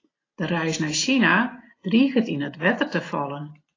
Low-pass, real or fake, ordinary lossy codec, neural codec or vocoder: 7.2 kHz; real; AAC, 32 kbps; none